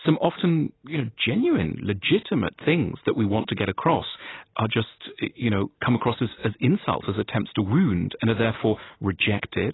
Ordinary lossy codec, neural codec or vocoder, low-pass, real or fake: AAC, 16 kbps; none; 7.2 kHz; real